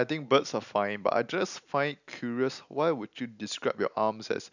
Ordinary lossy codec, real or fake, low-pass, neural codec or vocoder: none; real; 7.2 kHz; none